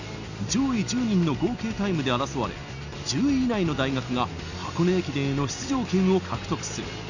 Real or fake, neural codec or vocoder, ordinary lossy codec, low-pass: real; none; none; 7.2 kHz